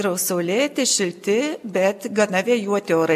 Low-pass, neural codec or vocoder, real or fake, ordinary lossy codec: 14.4 kHz; none; real; MP3, 96 kbps